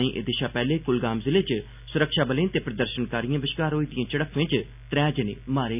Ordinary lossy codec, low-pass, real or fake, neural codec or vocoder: none; 3.6 kHz; real; none